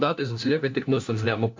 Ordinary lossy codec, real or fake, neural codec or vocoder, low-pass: MP3, 64 kbps; fake; codec, 16 kHz, 1 kbps, FunCodec, trained on LibriTTS, 50 frames a second; 7.2 kHz